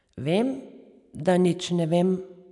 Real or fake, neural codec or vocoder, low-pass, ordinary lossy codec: real; none; 10.8 kHz; none